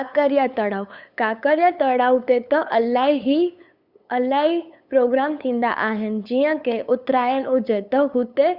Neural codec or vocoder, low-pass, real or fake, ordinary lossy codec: codec, 16 kHz, 8 kbps, FunCodec, trained on LibriTTS, 25 frames a second; 5.4 kHz; fake; Opus, 64 kbps